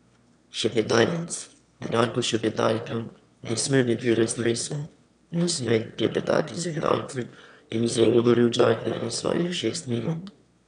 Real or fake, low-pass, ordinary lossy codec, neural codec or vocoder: fake; 9.9 kHz; none; autoencoder, 22.05 kHz, a latent of 192 numbers a frame, VITS, trained on one speaker